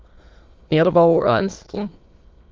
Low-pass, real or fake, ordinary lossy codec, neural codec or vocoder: 7.2 kHz; fake; Opus, 32 kbps; autoencoder, 22.05 kHz, a latent of 192 numbers a frame, VITS, trained on many speakers